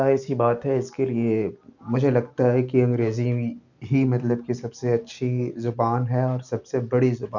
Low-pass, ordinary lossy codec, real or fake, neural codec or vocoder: 7.2 kHz; none; real; none